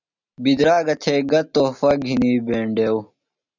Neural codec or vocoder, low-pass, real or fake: none; 7.2 kHz; real